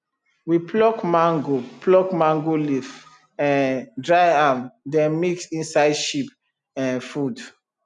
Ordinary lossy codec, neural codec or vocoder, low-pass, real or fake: none; none; 10.8 kHz; real